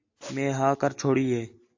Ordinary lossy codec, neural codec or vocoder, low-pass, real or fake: AAC, 48 kbps; none; 7.2 kHz; real